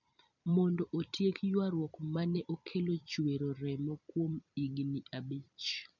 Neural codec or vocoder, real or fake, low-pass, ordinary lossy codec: vocoder, 44.1 kHz, 128 mel bands every 256 samples, BigVGAN v2; fake; 7.2 kHz; none